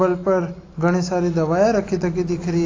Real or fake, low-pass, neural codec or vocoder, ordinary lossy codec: real; 7.2 kHz; none; AAC, 32 kbps